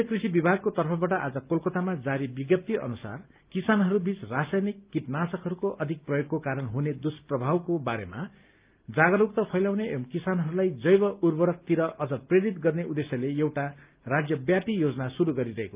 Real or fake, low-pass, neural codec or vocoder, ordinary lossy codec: real; 3.6 kHz; none; Opus, 24 kbps